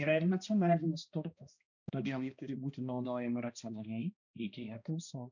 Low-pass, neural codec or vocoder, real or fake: 7.2 kHz; codec, 16 kHz, 1 kbps, X-Codec, HuBERT features, trained on balanced general audio; fake